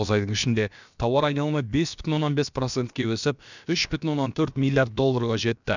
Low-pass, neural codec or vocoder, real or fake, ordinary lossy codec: 7.2 kHz; codec, 16 kHz, about 1 kbps, DyCAST, with the encoder's durations; fake; none